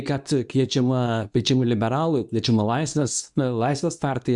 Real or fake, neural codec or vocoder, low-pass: fake; codec, 24 kHz, 0.9 kbps, WavTokenizer, medium speech release version 2; 10.8 kHz